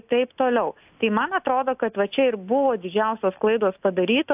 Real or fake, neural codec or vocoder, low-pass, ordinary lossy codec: real; none; 3.6 kHz; AAC, 32 kbps